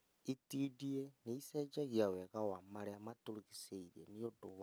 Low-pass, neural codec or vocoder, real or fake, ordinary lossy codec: none; none; real; none